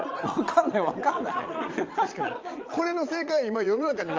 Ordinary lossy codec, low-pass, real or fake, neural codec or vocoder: Opus, 24 kbps; 7.2 kHz; fake; vocoder, 22.05 kHz, 80 mel bands, Vocos